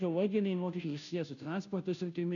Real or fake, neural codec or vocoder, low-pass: fake; codec, 16 kHz, 0.5 kbps, FunCodec, trained on Chinese and English, 25 frames a second; 7.2 kHz